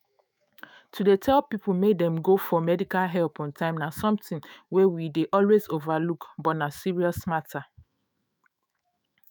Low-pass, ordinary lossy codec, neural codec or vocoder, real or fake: none; none; autoencoder, 48 kHz, 128 numbers a frame, DAC-VAE, trained on Japanese speech; fake